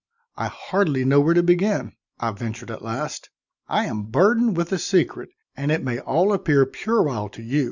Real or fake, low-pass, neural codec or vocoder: real; 7.2 kHz; none